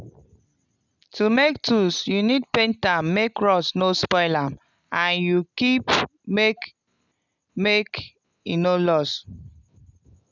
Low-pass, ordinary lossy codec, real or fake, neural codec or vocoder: 7.2 kHz; none; real; none